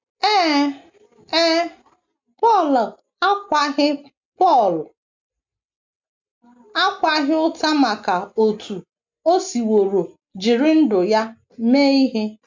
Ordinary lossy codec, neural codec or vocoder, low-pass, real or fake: MP3, 64 kbps; none; 7.2 kHz; real